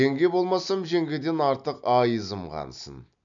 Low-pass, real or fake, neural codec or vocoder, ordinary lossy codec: 7.2 kHz; real; none; none